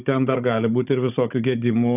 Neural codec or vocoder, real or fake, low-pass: codec, 16 kHz, 16 kbps, FunCodec, trained on Chinese and English, 50 frames a second; fake; 3.6 kHz